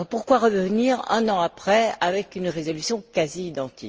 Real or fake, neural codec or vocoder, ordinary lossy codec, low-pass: real; none; Opus, 24 kbps; 7.2 kHz